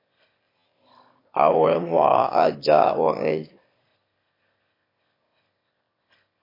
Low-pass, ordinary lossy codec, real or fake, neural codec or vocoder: 5.4 kHz; MP3, 32 kbps; fake; autoencoder, 22.05 kHz, a latent of 192 numbers a frame, VITS, trained on one speaker